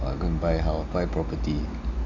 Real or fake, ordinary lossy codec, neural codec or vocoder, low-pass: real; none; none; 7.2 kHz